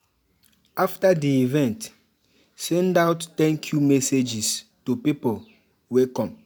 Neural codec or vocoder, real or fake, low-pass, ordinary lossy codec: none; real; none; none